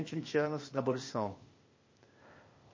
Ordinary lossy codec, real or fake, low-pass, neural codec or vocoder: MP3, 32 kbps; fake; 7.2 kHz; codec, 16 kHz, 0.8 kbps, ZipCodec